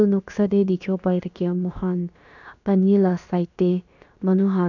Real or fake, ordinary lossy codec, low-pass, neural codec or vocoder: fake; none; 7.2 kHz; codec, 16 kHz, about 1 kbps, DyCAST, with the encoder's durations